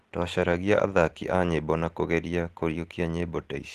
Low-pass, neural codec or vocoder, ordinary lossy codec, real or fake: 14.4 kHz; none; Opus, 16 kbps; real